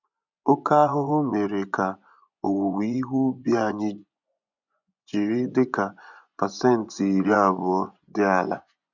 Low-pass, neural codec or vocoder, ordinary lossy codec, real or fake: 7.2 kHz; vocoder, 24 kHz, 100 mel bands, Vocos; none; fake